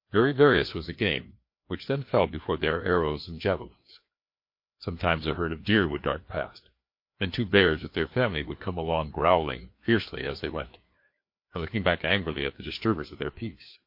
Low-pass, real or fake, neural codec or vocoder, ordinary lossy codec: 5.4 kHz; fake; codec, 16 kHz, 2 kbps, FreqCodec, larger model; MP3, 32 kbps